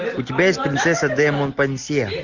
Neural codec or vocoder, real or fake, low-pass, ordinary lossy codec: none; real; 7.2 kHz; Opus, 64 kbps